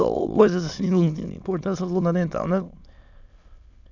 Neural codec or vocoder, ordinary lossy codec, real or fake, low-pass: autoencoder, 22.05 kHz, a latent of 192 numbers a frame, VITS, trained on many speakers; none; fake; 7.2 kHz